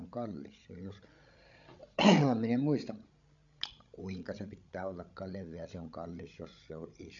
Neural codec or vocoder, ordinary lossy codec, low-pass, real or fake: codec, 16 kHz, 16 kbps, FreqCodec, larger model; none; 7.2 kHz; fake